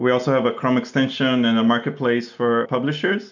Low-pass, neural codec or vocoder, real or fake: 7.2 kHz; none; real